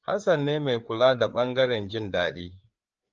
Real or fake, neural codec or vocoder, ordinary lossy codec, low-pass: fake; codec, 16 kHz, 4 kbps, FreqCodec, larger model; Opus, 24 kbps; 7.2 kHz